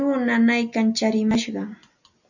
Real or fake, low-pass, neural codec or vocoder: real; 7.2 kHz; none